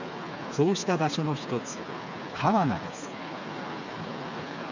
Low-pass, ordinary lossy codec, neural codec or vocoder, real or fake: 7.2 kHz; none; codec, 16 kHz, 4 kbps, FreqCodec, smaller model; fake